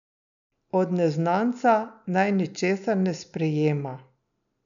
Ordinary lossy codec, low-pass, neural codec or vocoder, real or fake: none; 7.2 kHz; none; real